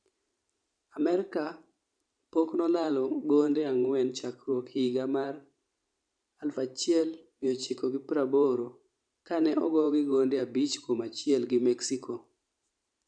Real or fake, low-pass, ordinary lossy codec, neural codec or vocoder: fake; 9.9 kHz; none; vocoder, 44.1 kHz, 128 mel bands every 512 samples, BigVGAN v2